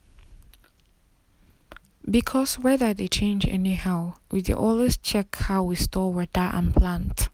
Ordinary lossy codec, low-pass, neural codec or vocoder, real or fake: none; 19.8 kHz; none; real